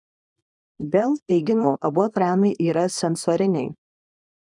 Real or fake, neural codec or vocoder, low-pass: fake; codec, 24 kHz, 0.9 kbps, WavTokenizer, small release; 10.8 kHz